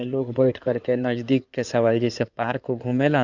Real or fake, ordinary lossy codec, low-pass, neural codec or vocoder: fake; none; 7.2 kHz; codec, 16 kHz in and 24 kHz out, 2.2 kbps, FireRedTTS-2 codec